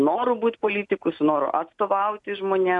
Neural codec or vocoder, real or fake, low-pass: none; real; 10.8 kHz